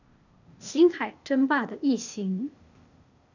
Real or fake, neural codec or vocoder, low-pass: fake; codec, 16 kHz, 0.8 kbps, ZipCodec; 7.2 kHz